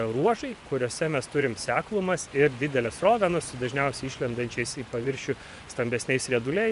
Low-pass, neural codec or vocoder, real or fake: 10.8 kHz; vocoder, 24 kHz, 100 mel bands, Vocos; fake